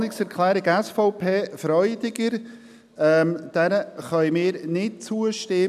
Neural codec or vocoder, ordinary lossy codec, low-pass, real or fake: none; none; 14.4 kHz; real